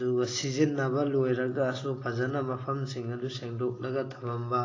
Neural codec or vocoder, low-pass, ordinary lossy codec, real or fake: none; 7.2 kHz; AAC, 32 kbps; real